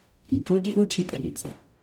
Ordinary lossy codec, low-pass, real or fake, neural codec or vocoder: none; 19.8 kHz; fake; codec, 44.1 kHz, 0.9 kbps, DAC